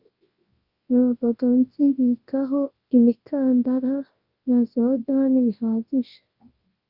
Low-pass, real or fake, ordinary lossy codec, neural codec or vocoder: 5.4 kHz; fake; Opus, 32 kbps; codec, 24 kHz, 0.9 kbps, WavTokenizer, large speech release